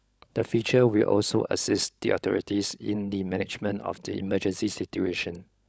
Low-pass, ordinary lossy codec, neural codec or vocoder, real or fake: none; none; codec, 16 kHz, 16 kbps, FunCodec, trained on LibriTTS, 50 frames a second; fake